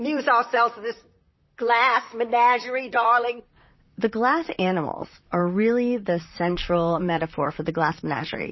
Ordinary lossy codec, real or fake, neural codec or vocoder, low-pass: MP3, 24 kbps; fake; vocoder, 44.1 kHz, 128 mel bands, Pupu-Vocoder; 7.2 kHz